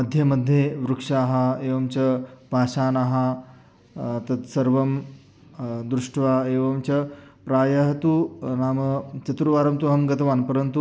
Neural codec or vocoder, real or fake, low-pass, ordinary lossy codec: none; real; none; none